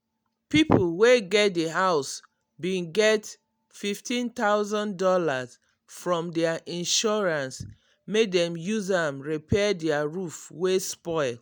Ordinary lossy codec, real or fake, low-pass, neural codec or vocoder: none; real; none; none